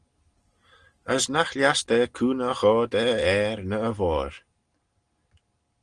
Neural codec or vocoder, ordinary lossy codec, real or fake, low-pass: none; Opus, 24 kbps; real; 9.9 kHz